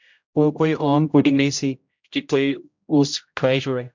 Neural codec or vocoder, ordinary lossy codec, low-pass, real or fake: codec, 16 kHz, 0.5 kbps, X-Codec, HuBERT features, trained on general audio; MP3, 64 kbps; 7.2 kHz; fake